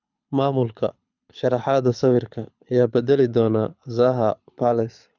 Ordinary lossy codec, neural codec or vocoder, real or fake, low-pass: none; codec, 24 kHz, 6 kbps, HILCodec; fake; 7.2 kHz